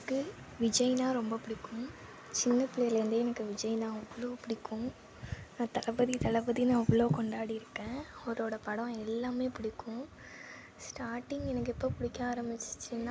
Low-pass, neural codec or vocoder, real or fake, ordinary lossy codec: none; none; real; none